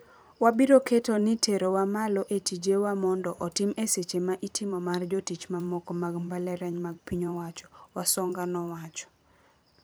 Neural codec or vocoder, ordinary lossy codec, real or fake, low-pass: vocoder, 44.1 kHz, 128 mel bands every 512 samples, BigVGAN v2; none; fake; none